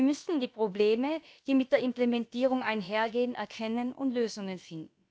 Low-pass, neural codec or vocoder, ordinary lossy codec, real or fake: none; codec, 16 kHz, about 1 kbps, DyCAST, with the encoder's durations; none; fake